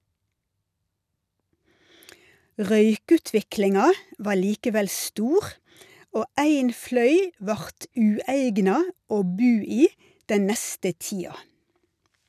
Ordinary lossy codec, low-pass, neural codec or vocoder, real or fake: none; 14.4 kHz; none; real